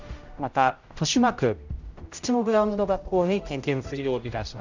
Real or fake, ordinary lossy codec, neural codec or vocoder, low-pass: fake; Opus, 64 kbps; codec, 16 kHz, 0.5 kbps, X-Codec, HuBERT features, trained on general audio; 7.2 kHz